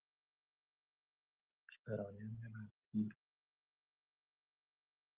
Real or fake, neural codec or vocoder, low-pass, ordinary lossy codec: real; none; 3.6 kHz; Opus, 16 kbps